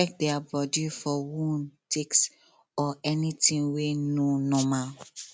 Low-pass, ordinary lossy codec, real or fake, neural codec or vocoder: none; none; real; none